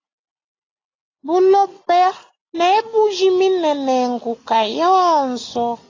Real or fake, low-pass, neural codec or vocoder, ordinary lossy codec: real; 7.2 kHz; none; AAC, 32 kbps